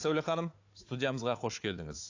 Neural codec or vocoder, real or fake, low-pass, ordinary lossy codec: codec, 16 kHz, 4 kbps, FunCodec, trained on Chinese and English, 50 frames a second; fake; 7.2 kHz; AAC, 48 kbps